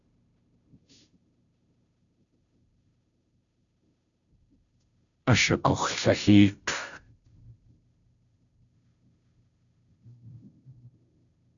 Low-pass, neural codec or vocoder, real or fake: 7.2 kHz; codec, 16 kHz, 0.5 kbps, FunCodec, trained on Chinese and English, 25 frames a second; fake